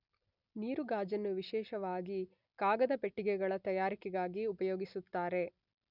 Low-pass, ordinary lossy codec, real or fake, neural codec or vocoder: 5.4 kHz; none; real; none